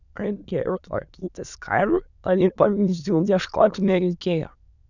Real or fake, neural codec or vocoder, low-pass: fake; autoencoder, 22.05 kHz, a latent of 192 numbers a frame, VITS, trained on many speakers; 7.2 kHz